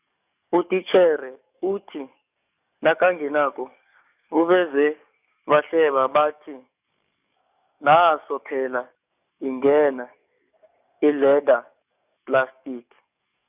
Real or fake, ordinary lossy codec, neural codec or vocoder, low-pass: fake; none; codec, 44.1 kHz, 7.8 kbps, DAC; 3.6 kHz